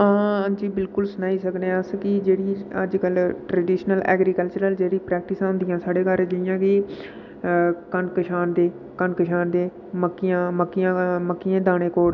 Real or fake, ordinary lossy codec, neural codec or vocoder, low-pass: real; none; none; 7.2 kHz